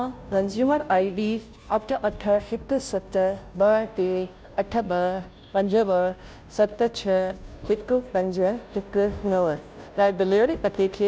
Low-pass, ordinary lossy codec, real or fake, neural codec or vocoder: none; none; fake; codec, 16 kHz, 0.5 kbps, FunCodec, trained on Chinese and English, 25 frames a second